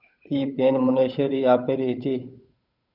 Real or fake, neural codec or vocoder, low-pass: fake; codec, 16 kHz, 8 kbps, FunCodec, trained on Chinese and English, 25 frames a second; 5.4 kHz